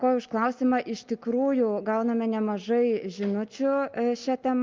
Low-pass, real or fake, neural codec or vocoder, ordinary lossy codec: 7.2 kHz; real; none; Opus, 24 kbps